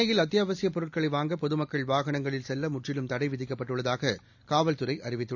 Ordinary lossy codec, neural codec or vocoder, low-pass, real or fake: none; none; 7.2 kHz; real